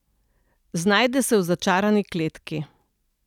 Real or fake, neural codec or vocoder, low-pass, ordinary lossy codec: real; none; 19.8 kHz; none